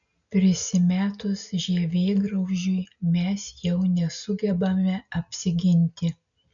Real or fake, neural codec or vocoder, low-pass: real; none; 7.2 kHz